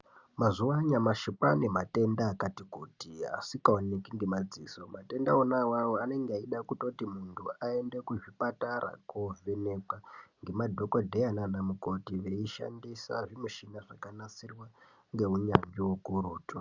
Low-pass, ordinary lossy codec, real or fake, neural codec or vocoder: 7.2 kHz; Opus, 64 kbps; real; none